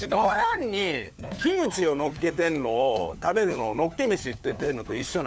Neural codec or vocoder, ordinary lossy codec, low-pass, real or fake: codec, 16 kHz, 4 kbps, FunCodec, trained on LibriTTS, 50 frames a second; none; none; fake